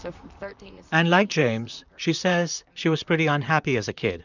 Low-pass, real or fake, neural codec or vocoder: 7.2 kHz; fake; vocoder, 44.1 kHz, 128 mel bands, Pupu-Vocoder